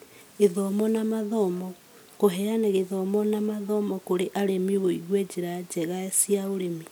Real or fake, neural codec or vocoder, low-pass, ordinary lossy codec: real; none; none; none